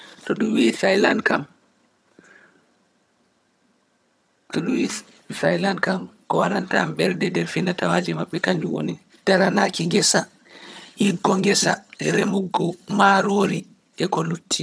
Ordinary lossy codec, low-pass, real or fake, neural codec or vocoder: none; none; fake; vocoder, 22.05 kHz, 80 mel bands, HiFi-GAN